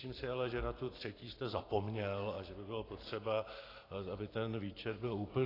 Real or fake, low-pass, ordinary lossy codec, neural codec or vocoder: fake; 5.4 kHz; AAC, 24 kbps; vocoder, 44.1 kHz, 128 mel bands every 512 samples, BigVGAN v2